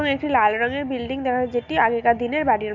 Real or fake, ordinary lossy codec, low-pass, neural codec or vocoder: real; none; 7.2 kHz; none